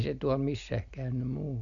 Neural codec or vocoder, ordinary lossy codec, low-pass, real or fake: none; none; 7.2 kHz; real